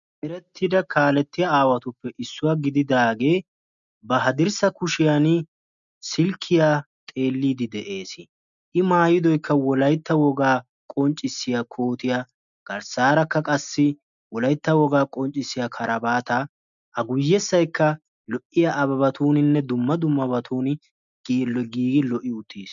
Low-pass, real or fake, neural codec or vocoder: 7.2 kHz; real; none